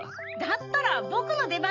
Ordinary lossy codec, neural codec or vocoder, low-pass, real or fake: none; none; 7.2 kHz; real